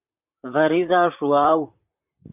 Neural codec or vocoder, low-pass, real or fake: vocoder, 44.1 kHz, 128 mel bands, Pupu-Vocoder; 3.6 kHz; fake